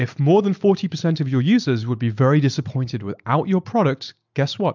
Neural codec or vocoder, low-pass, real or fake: none; 7.2 kHz; real